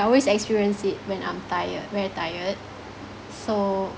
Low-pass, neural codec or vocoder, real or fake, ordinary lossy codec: none; none; real; none